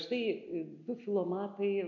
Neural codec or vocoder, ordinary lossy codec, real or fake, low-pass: none; MP3, 64 kbps; real; 7.2 kHz